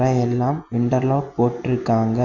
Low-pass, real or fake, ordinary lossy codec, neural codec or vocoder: 7.2 kHz; real; none; none